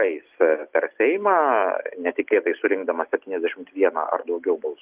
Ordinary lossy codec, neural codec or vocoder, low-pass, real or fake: Opus, 24 kbps; none; 3.6 kHz; real